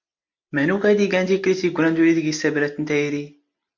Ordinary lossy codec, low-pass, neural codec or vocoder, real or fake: MP3, 64 kbps; 7.2 kHz; none; real